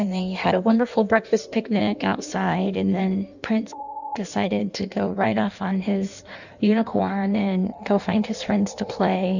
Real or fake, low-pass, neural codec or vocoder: fake; 7.2 kHz; codec, 16 kHz in and 24 kHz out, 1.1 kbps, FireRedTTS-2 codec